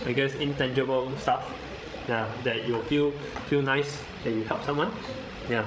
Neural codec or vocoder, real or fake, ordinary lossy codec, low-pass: codec, 16 kHz, 16 kbps, FreqCodec, larger model; fake; none; none